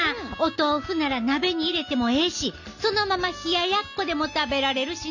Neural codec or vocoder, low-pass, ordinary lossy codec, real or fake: none; 7.2 kHz; none; real